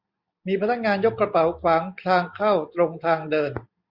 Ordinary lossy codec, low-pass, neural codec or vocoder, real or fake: Opus, 64 kbps; 5.4 kHz; none; real